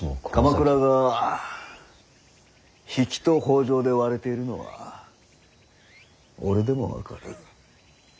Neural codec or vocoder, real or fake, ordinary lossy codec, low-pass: none; real; none; none